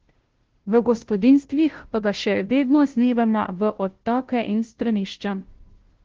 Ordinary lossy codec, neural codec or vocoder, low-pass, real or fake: Opus, 16 kbps; codec, 16 kHz, 0.5 kbps, FunCodec, trained on Chinese and English, 25 frames a second; 7.2 kHz; fake